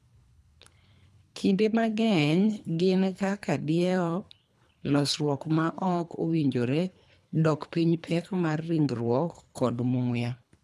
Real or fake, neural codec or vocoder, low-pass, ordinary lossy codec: fake; codec, 24 kHz, 3 kbps, HILCodec; none; none